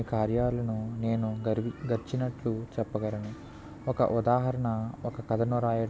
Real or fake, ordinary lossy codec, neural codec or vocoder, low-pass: real; none; none; none